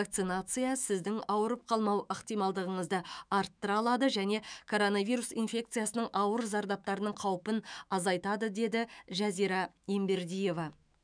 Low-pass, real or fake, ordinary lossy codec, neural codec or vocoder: 9.9 kHz; fake; none; autoencoder, 48 kHz, 128 numbers a frame, DAC-VAE, trained on Japanese speech